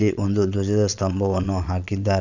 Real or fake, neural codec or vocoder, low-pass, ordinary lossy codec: fake; vocoder, 22.05 kHz, 80 mel bands, Vocos; 7.2 kHz; none